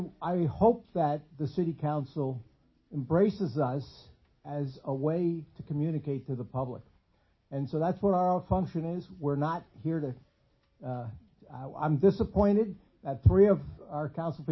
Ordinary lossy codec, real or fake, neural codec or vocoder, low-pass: MP3, 24 kbps; real; none; 7.2 kHz